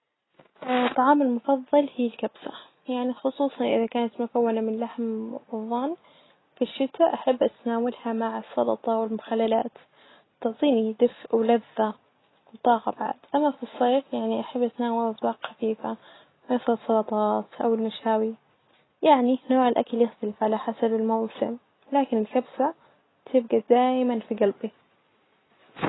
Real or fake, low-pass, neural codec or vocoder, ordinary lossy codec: real; 7.2 kHz; none; AAC, 16 kbps